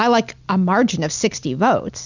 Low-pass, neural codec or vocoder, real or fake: 7.2 kHz; none; real